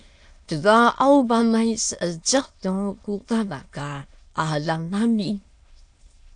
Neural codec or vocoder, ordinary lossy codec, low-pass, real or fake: autoencoder, 22.05 kHz, a latent of 192 numbers a frame, VITS, trained on many speakers; AAC, 64 kbps; 9.9 kHz; fake